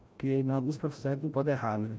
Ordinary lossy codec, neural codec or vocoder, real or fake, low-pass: none; codec, 16 kHz, 0.5 kbps, FreqCodec, larger model; fake; none